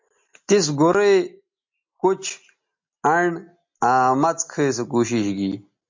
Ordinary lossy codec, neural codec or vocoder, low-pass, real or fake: MP3, 48 kbps; none; 7.2 kHz; real